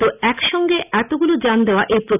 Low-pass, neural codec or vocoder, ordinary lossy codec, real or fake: 3.6 kHz; none; none; real